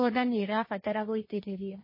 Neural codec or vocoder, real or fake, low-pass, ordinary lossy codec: codec, 16 kHz, 1.1 kbps, Voila-Tokenizer; fake; 5.4 kHz; MP3, 24 kbps